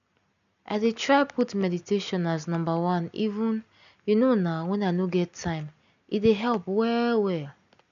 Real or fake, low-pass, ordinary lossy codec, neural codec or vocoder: real; 7.2 kHz; none; none